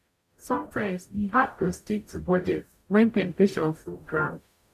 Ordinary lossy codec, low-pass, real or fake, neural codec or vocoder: none; 14.4 kHz; fake; codec, 44.1 kHz, 0.9 kbps, DAC